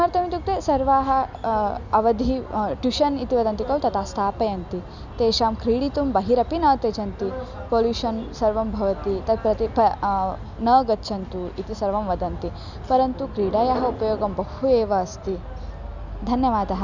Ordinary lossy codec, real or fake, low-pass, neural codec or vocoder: none; real; 7.2 kHz; none